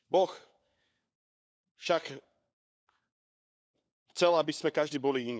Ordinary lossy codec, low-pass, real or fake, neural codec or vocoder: none; none; fake; codec, 16 kHz, 4 kbps, FunCodec, trained on LibriTTS, 50 frames a second